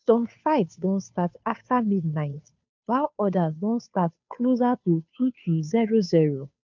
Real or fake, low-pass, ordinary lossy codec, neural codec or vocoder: fake; 7.2 kHz; MP3, 64 kbps; codec, 16 kHz, 2 kbps, FunCodec, trained on Chinese and English, 25 frames a second